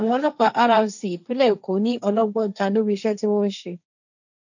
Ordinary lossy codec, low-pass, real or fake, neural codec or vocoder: none; 7.2 kHz; fake; codec, 16 kHz, 1.1 kbps, Voila-Tokenizer